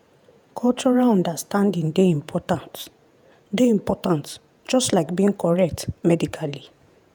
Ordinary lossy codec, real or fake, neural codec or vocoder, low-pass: none; fake; vocoder, 48 kHz, 128 mel bands, Vocos; none